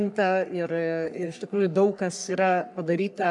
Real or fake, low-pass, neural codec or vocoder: fake; 10.8 kHz; codec, 44.1 kHz, 3.4 kbps, Pupu-Codec